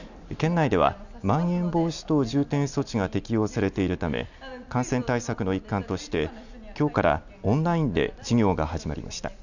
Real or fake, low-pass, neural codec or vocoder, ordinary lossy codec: real; 7.2 kHz; none; none